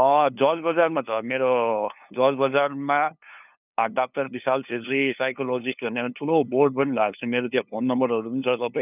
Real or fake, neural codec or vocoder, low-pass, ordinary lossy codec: fake; codec, 16 kHz, 2 kbps, FunCodec, trained on LibriTTS, 25 frames a second; 3.6 kHz; none